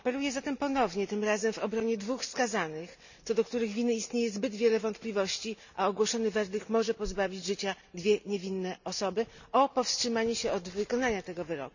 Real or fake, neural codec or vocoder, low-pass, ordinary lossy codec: real; none; 7.2 kHz; none